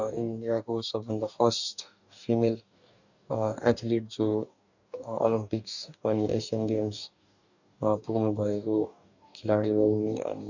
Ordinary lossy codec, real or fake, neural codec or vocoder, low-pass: none; fake; codec, 44.1 kHz, 2.6 kbps, DAC; 7.2 kHz